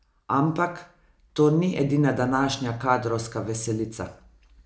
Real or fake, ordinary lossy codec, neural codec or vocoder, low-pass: real; none; none; none